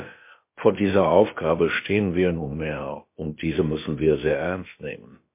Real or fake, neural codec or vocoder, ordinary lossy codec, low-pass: fake; codec, 16 kHz, about 1 kbps, DyCAST, with the encoder's durations; MP3, 24 kbps; 3.6 kHz